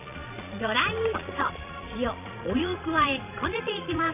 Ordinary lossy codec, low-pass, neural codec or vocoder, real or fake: none; 3.6 kHz; vocoder, 22.05 kHz, 80 mel bands, Vocos; fake